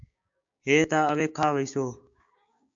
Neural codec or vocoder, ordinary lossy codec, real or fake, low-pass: codec, 16 kHz, 6 kbps, DAC; AAC, 64 kbps; fake; 7.2 kHz